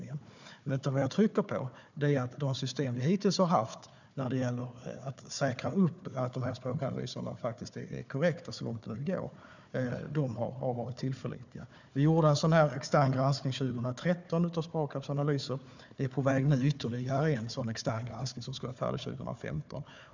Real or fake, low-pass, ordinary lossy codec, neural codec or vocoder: fake; 7.2 kHz; none; codec, 16 kHz, 4 kbps, FunCodec, trained on Chinese and English, 50 frames a second